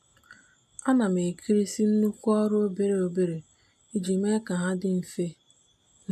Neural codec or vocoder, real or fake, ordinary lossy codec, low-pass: none; real; AAC, 96 kbps; 14.4 kHz